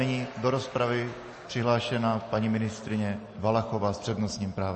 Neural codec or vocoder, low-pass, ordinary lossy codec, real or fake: none; 10.8 kHz; MP3, 32 kbps; real